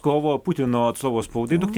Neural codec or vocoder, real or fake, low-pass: none; real; 19.8 kHz